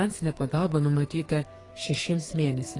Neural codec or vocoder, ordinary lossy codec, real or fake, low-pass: codec, 32 kHz, 1.9 kbps, SNAC; AAC, 32 kbps; fake; 10.8 kHz